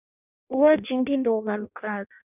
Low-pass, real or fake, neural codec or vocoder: 3.6 kHz; fake; codec, 16 kHz in and 24 kHz out, 0.6 kbps, FireRedTTS-2 codec